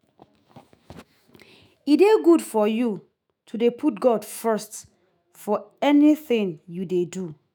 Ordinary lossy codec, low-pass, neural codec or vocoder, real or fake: none; none; autoencoder, 48 kHz, 128 numbers a frame, DAC-VAE, trained on Japanese speech; fake